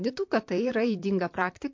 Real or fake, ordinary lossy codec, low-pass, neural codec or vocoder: fake; MP3, 48 kbps; 7.2 kHz; vocoder, 44.1 kHz, 128 mel bands, Pupu-Vocoder